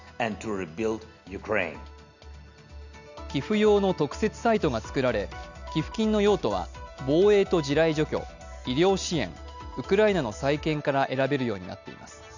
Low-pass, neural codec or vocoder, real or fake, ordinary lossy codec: 7.2 kHz; none; real; none